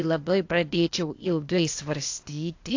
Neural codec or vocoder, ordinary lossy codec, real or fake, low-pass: codec, 16 kHz, 0.8 kbps, ZipCodec; AAC, 48 kbps; fake; 7.2 kHz